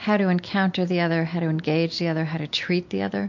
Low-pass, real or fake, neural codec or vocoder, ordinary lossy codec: 7.2 kHz; real; none; MP3, 48 kbps